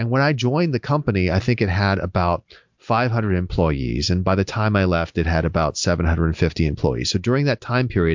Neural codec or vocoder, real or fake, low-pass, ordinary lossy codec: none; real; 7.2 kHz; MP3, 64 kbps